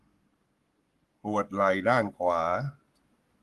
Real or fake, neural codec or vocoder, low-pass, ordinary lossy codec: fake; codec, 44.1 kHz, 7.8 kbps, Pupu-Codec; 14.4 kHz; Opus, 24 kbps